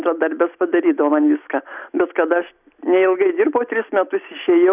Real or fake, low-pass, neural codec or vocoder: real; 3.6 kHz; none